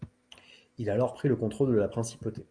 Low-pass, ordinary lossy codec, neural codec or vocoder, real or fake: 9.9 kHz; Opus, 32 kbps; none; real